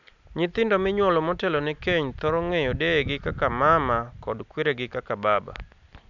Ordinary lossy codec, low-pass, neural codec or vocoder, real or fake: none; 7.2 kHz; none; real